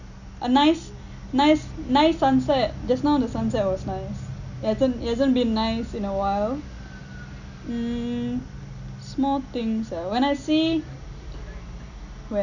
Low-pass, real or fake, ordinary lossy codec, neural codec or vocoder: 7.2 kHz; real; none; none